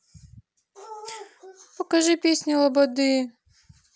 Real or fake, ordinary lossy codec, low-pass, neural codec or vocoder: real; none; none; none